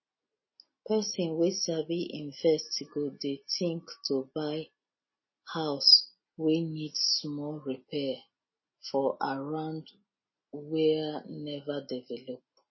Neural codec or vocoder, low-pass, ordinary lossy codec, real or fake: none; 7.2 kHz; MP3, 24 kbps; real